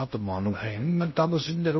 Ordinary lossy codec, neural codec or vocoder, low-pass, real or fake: MP3, 24 kbps; codec, 16 kHz in and 24 kHz out, 0.6 kbps, FocalCodec, streaming, 2048 codes; 7.2 kHz; fake